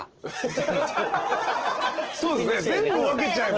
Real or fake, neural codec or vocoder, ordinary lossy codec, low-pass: real; none; Opus, 16 kbps; 7.2 kHz